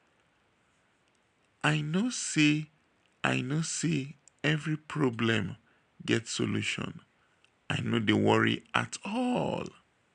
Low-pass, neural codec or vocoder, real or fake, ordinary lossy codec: 9.9 kHz; none; real; none